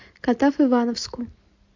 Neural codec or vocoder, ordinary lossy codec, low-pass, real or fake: none; MP3, 64 kbps; 7.2 kHz; real